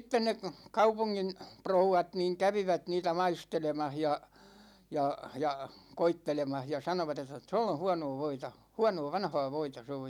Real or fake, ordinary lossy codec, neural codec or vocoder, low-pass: real; none; none; 19.8 kHz